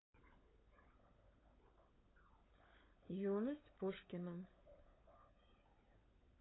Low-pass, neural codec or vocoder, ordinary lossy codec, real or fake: 7.2 kHz; codec, 16 kHz, 16 kbps, FunCodec, trained on LibriTTS, 50 frames a second; AAC, 16 kbps; fake